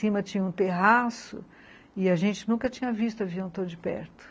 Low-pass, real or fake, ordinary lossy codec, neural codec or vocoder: none; real; none; none